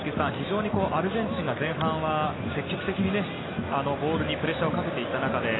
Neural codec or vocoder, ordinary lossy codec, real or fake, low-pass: none; AAC, 16 kbps; real; 7.2 kHz